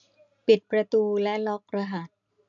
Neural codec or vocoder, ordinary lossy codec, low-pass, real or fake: none; AAC, 64 kbps; 7.2 kHz; real